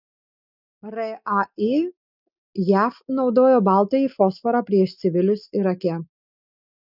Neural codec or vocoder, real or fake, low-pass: none; real; 5.4 kHz